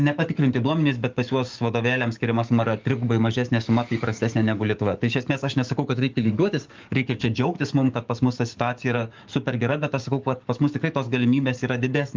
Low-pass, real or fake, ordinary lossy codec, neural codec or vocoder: 7.2 kHz; fake; Opus, 24 kbps; codec, 44.1 kHz, 7.8 kbps, DAC